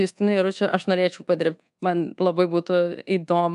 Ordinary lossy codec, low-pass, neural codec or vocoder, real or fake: AAC, 96 kbps; 10.8 kHz; codec, 24 kHz, 1.2 kbps, DualCodec; fake